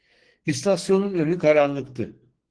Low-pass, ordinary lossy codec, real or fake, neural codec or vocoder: 9.9 kHz; Opus, 16 kbps; fake; codec, 44.1 kHz, 2.6 kbps, SNAC